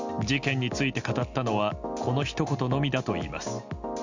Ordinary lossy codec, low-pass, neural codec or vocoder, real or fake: Opus, 64 kbps; 7.2 kHz; none; real